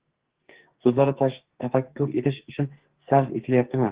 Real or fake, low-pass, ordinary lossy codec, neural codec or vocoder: fake; 3.6 kHz; Opus, 16 kbps; codec, 44.1 kHz, 2.6 kbps, SNAC